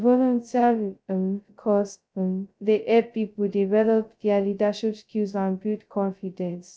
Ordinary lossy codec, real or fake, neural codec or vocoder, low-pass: none; fake; codec, 16 kHz, 0.2 kbps, FocalCodec; none